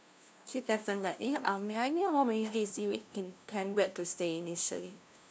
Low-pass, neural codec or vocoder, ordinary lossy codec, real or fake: none; codec, 16 kHz, 0.5 kbps, FunCodec, trained on LibriTTS, 25 frames a second; none; fake